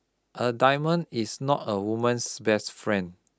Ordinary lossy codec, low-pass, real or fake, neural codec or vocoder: none; none; real; none